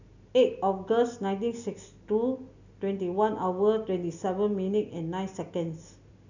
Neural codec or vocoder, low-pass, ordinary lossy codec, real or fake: none; 7.2 kHz; none; real